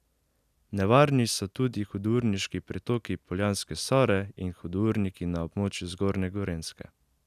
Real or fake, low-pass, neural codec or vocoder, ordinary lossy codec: real; 14.4 kHz; none; none